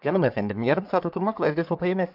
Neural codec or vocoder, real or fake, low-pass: codec, 44.1 kHz, 3.4 kbps, Pupu-Codec; fake; 5.4 kHz